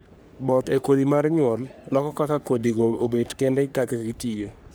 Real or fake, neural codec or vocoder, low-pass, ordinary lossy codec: fake; codec, 44.1 kHz, 3.4 kbps, Pupu-Codec; none; none